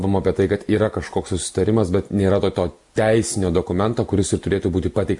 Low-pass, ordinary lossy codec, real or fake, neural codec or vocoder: 10.8 kHz; MP3, 64 kbps; real; none